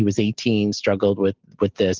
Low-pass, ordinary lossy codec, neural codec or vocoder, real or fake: 7.2 kHz; Opus, 32 kbps; none; real